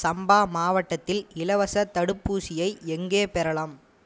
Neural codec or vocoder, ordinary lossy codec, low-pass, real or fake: none; none; none; real